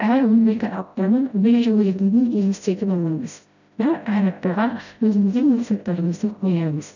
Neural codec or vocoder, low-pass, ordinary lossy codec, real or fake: codec, 16 kHz, 0.5 kbps, FreqCodec, smaller model; 7.2 kHz; none; fake